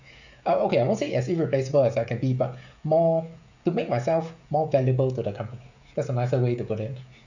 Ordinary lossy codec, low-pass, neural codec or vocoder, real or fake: none; 7.2 kHz; codec, 16 kHz, 16 kbps, FreqCodec, smaller model; fake